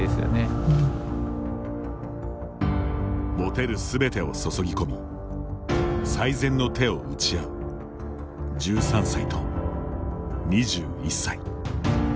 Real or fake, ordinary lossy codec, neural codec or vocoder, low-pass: real; none; none; none